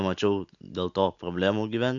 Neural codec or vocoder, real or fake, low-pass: none; real; 7.2 kHz